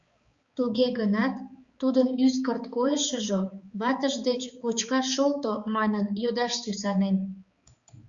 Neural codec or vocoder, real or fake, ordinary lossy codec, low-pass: codec, 16 kHz, 4 kbps, X-Codec, HuBERT features, trained on balanced general audio; fake; Opus, 64 kbps; 7.2 kHz